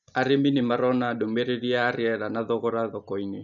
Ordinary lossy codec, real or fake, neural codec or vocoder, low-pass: none; real; none; 7.2 kHz